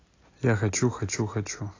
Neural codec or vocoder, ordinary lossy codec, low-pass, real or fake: none; AAC, 32 kbps; 7.2 kHz; real